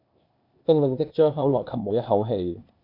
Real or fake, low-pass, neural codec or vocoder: fake; 5.4 kHz; codec, 16 kHz, 0.8 kbps, ZipCodec